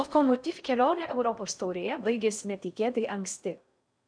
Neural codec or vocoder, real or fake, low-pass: codec, 16 kHz in and 24 kHz out, 0.6 kbps, FocalCodec, streaming, 4096 codes; fake; 9.9 kHz